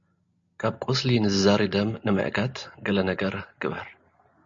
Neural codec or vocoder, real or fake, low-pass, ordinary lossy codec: none; real; 7.2 kHz; MP3, 48 kbps